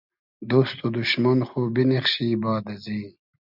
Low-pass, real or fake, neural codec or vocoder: 5.4 kHz; real; none